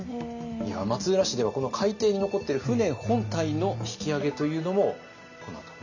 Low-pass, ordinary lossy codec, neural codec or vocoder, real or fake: 7.2 kHz; none; none; real